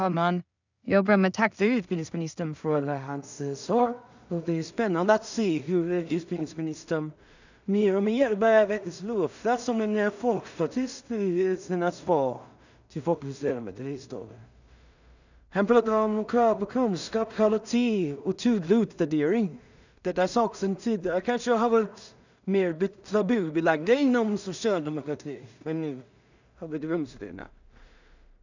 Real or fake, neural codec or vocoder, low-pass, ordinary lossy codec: fake; codec, 16 kHz in and 24 kHz out, 0.4 kbps, LongCat-Audio-Codec, two codebook decoder; 7.2 kHz; none